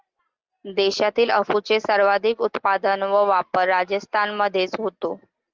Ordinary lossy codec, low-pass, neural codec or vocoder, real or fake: Opus, 24 kbps; 7.2 kHz; none; real